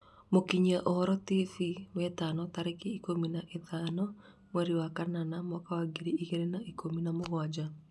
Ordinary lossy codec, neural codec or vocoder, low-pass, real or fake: none; none; none; real